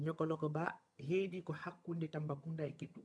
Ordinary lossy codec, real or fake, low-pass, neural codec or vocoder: none; fake; none; vocoder, 22.05 kHz, 80 mel bands, HiFi-GAN